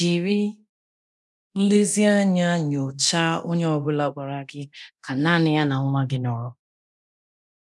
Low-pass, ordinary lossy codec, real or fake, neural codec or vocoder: none; none; fake; codec, 24 kHz, 0.9 kbps, DualCodec